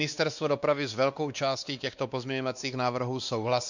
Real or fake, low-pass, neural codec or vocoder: fake; 7.2 kHz; codec, 16 kHz, 2 kbps, X-Codec, WavLM features, trained on Multilingual LibriSpeech